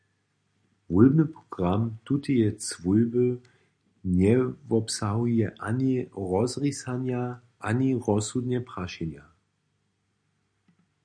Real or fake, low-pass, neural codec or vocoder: real; 9.9 kHz; none